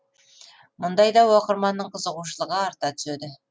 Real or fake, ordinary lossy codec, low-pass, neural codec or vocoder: real; none; none; none